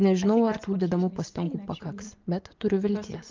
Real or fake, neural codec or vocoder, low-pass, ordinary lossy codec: fake; vocoder, 24 kHz, 100 mel bands, Vocos; 7.2 kHz; Opus, 24 kbps